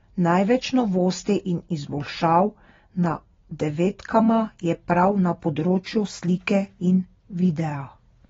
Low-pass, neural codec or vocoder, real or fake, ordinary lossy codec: 7.2 kHz; none; real; AAC, 24 kbps